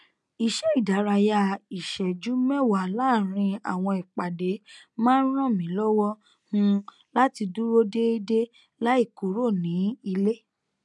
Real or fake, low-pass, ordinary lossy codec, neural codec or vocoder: real; 10.8 kHz; none; none